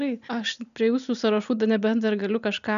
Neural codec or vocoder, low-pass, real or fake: none; 7.2 kHz; real